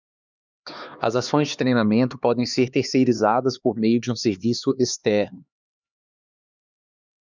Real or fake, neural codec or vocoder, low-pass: fake; codec, 16 kHz, 2 kbps, X-Codec, HuBERT features, trained on LibriSpeech; 7.2 kHz